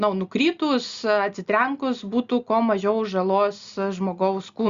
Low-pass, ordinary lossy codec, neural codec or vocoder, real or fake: 7.2 kHz; Opus, 64 kbps; none; real